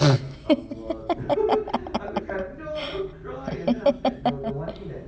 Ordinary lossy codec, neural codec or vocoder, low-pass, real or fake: none; none; none; real